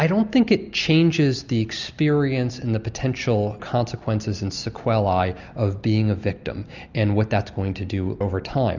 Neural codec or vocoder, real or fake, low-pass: none; real; 7.2 kHz